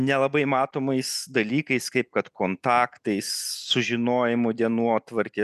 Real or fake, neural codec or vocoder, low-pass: real; none; 14.4 kHz